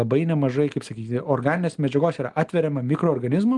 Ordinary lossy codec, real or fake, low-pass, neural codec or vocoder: Opus, 24 kbps; real; 10.8 kHz; none